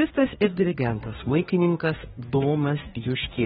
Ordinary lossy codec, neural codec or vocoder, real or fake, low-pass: AAC, 16 kbps; codec, 24 kHz, 1 kbps, SNAC; fake; 10.8 kHz